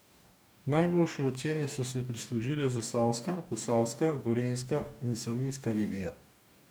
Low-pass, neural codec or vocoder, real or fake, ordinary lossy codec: none; codec, 44.1 kHz, 2.6 kbps, DAC; fake; none